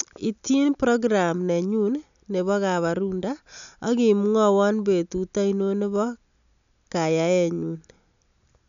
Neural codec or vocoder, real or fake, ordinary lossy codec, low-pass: none; real; none; 7.2 kHz